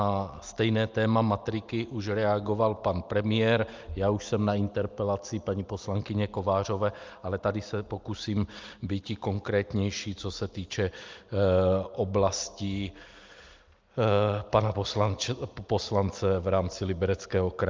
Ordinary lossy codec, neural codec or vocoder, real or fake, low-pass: Opus, 24 kbps; none; real; 7.2 kHz